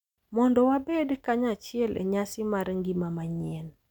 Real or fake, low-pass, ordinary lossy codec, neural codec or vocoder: real; 19.8 kHz; none; none